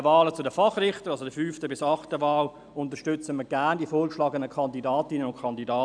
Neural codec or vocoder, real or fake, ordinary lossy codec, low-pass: none; real; none; 9.9 kHz